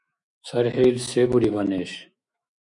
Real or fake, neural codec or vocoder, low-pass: fake; autoencoder, 48 kHz, 128 numbers a frame, DAC-VAE, trained on Japanese speech; 10.8 kHz